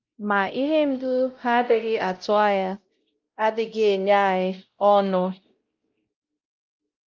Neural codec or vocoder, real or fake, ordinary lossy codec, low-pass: codec, 16 kHz, 0.5 kbps, X-Codec, WavLM features, trained on Multilingual LibriSpeech; fake; Opus, 24 kbps; 7.2 kHz